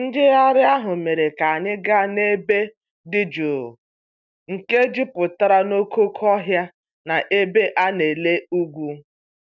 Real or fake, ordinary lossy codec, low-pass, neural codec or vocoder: real; none; 7.2 kHz; none